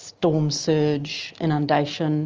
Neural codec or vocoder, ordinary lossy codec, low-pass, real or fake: none; Opus, 24 kbps; 7.2 kHz; real